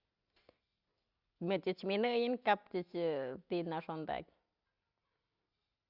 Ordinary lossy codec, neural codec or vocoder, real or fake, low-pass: Opus, 64 kbps; none; real; 5.4 kHz